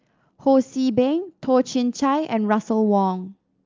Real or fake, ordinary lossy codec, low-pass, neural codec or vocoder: real; Opus, 32 kbps; 7.2 kHz; none